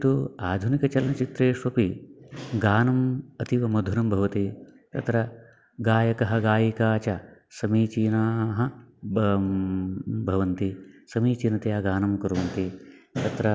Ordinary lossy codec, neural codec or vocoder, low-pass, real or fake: none; none; none; real